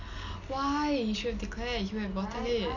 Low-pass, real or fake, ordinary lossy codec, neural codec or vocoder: 7.2 kHz; real; none; none